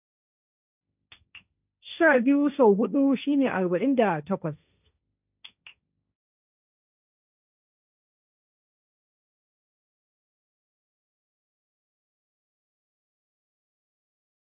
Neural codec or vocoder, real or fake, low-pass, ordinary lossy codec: codec, 16 kHz, 1.1 kbps, Voila-Tokenizer; fake; 3.6 kHz; none